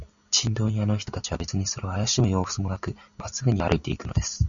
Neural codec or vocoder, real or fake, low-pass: none; real; 7.2 kHz